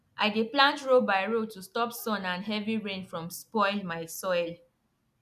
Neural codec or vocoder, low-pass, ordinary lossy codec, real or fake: none; 14.4 kHz; none; real